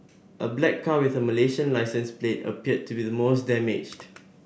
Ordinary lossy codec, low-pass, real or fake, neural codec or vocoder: none; none; real; none